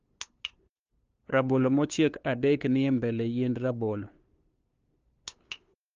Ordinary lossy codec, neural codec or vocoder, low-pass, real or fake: Opus, 24 kbps; codec, 16 kHz, 2 kbps, FunCodec, trained on LibriTTS, 25 frames a second; 7.2 kHz; fake